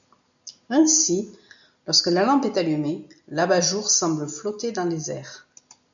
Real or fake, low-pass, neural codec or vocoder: real; 7.2 kHz; none